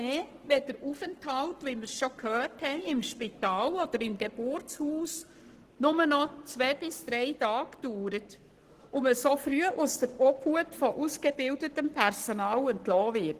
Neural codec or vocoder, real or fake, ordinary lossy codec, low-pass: codec, 44.1 kHz, 7.8 kbps, Pupu-Codec; fake; Opus, 16 kbps; 14.4 kHz